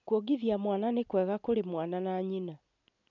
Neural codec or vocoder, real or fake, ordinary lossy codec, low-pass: none; real; none; 7.2 kHz